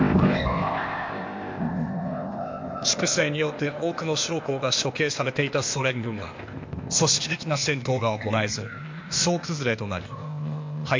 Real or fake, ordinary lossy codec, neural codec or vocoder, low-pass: fake; MP3, 48 kbps; codec, 16 kHz, 0.8 kbps, ZipCodec; 7.2 kHz